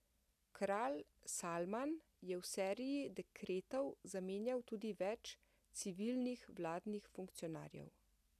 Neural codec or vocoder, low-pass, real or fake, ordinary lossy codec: none; 14.4 kHz; real; none